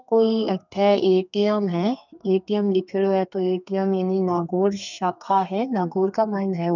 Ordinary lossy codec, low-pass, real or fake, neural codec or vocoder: none; 7.2 kHz; fake; codec, 32 kHz, 1.9 kbps, SNAC